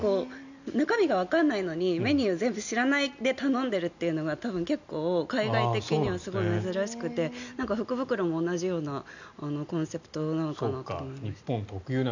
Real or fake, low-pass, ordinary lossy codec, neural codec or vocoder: real; 7.2 kHz; none; none